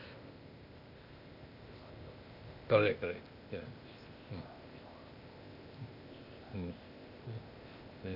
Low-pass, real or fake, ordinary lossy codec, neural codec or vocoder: 5.4 kHz; fake; none; codec, 16 kHz, 0.8 kbps, ZipCodec